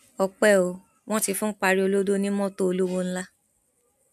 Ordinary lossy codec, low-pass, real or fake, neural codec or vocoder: none; 14.4 kHz; real; none